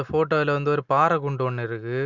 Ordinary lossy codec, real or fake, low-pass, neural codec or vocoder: none; real; 7.2 kHz; none